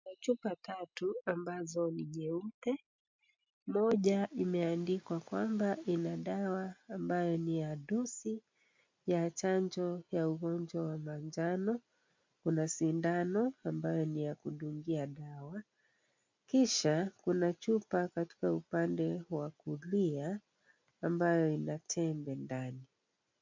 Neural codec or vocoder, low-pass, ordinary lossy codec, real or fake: none; 7.2 kHz; MP3, 64 kbps; real